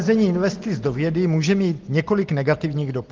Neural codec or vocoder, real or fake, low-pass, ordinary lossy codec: none; real; 7.2 kHz; Opus, 16 kbps